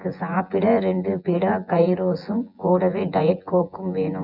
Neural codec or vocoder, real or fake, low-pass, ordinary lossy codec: vocoder, 24 kHz, 100 mel bands, Vocos; fake; 5.4 kHz; none